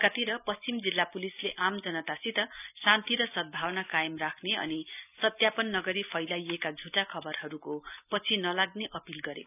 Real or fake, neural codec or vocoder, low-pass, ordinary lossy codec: real; none; 3.6 kHz; none